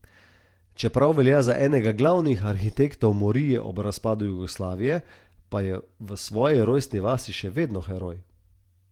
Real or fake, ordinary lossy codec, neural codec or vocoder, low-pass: fake; Opus, 24 kbps; vocoder, 48 kHz, 128 mel bands, Vocos; 19.8 kHz